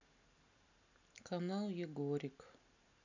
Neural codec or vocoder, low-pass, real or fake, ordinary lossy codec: none; 7.2 kHz; real; none